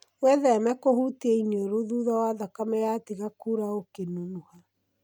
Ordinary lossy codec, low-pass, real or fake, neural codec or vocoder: none; none; real; none